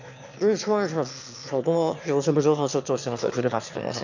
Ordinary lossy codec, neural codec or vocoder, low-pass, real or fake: none; autoencoder, 22.05 kHz, a latent of 192 numbers a frame, VITS, trained on one speaker; 7.2 kHz; fake